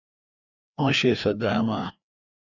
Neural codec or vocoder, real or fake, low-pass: codec, 16 kHz, 2 kbps, FreqCodec, larger model; fake; 7.2 kHz